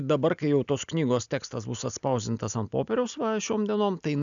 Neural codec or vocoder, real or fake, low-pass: none; real; 7.2 kHz